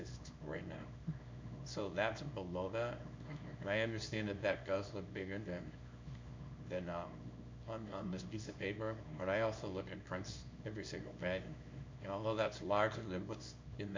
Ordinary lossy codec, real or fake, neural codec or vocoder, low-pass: MP3, 64 kbps; fake; codec, 24 kHz, 0.9 kbps, WavTokenizer, small release; 7.2 kHz